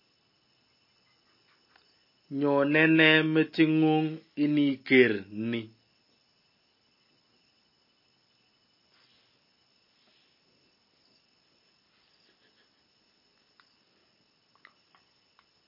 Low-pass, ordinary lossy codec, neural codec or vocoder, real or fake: 5.4 kHz; MP3, 24 kbps; none; real